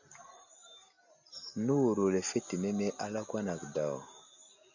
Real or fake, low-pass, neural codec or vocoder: real; 7.2 kHz; none